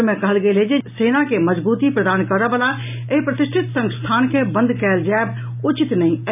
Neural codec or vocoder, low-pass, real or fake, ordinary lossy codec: none; 3.6 kHz; real; none